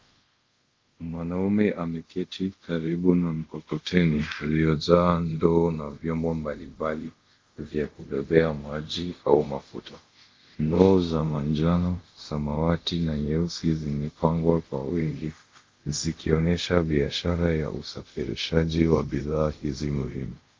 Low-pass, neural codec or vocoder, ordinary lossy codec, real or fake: 7.2 kHz; codec, 24 kHz, 0.5 kbps, DualCodec; Opus, 32 kbps; fake